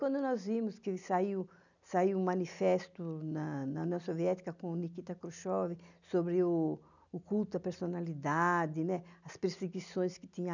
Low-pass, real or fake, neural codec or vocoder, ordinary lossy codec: 7.2 kHz; real; none; none